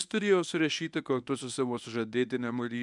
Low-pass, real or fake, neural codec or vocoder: 10.8 kHz; fake; codec, 24 kHz, 0.9 kbps, WavTokenizer, medium speech release version 1